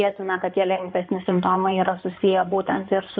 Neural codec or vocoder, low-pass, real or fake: codec, 16 kHz in and 24 kHz out, 2.2 kbps, FireRedTTS-2 codec; 7.2 kHz; fake